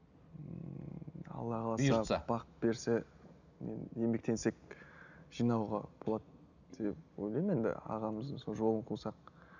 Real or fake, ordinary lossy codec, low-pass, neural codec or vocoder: real; none; 7.2 kHz; none